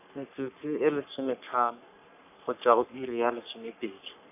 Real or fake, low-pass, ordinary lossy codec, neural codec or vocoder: fake; 3.6 kHz; none; codec, 44.1 kHz, 3.4 kbps, Pupu-Codec